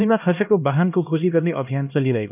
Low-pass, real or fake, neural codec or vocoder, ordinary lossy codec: 3.6 kHz; fake; codec, 16 kHz, 1 kbps, X-Codec, HuBERT features, trained on LibriSpeech; none